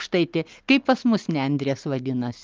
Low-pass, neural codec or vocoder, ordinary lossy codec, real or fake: 7.2 kHz; none; Opus, 24 kbps; real